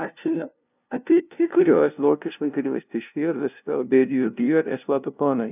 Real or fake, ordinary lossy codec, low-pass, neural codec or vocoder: fake; none; 3.6 kHz; codec, 16 kHz, 0.5 kbps, FunCodec, trained on LibriTTS, 25 frames a second